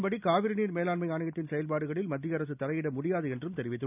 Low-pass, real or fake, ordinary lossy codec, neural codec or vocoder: 3.6 kHz; real; none; none